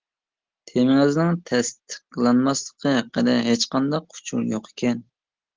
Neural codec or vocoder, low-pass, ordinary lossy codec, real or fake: none; 7.2 kHz; Opus, 16 kbps; real